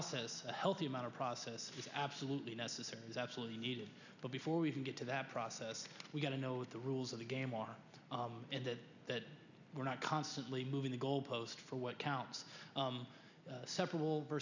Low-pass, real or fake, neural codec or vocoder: 7.2 kHz; real; none